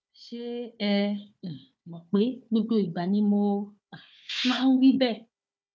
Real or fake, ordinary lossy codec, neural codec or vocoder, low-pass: fake; none; codec, 16 kHz, 4 kbps, FunCodec, trained on Chinese and English, 50 frames a second; none